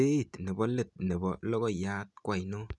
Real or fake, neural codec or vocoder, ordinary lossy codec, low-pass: real; none; none; 10.8 kHz